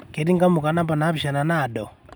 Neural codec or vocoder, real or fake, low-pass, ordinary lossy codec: none; real; none; none